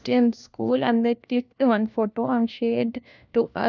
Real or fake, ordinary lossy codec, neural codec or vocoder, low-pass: fake; none; codec, 16 kHz, 1 kbps, FunCodec, trained on LibriTTS, 50 frames a second; 7.2 kHz